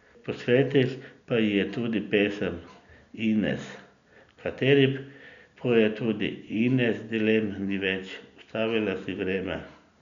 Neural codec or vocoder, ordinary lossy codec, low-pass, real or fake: none; MP3, 96 kbps; 7.2 kHz; real